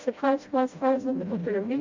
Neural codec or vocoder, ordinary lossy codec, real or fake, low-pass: codec, 16 kHz, 0.5 kbps, FreqCodec, smaller model; MP3, 48 kbps; fake; 7.2 kHz